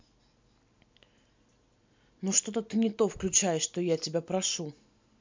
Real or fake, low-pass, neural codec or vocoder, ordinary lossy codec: real; 7.2 kHz; none; MP3, 64 kbps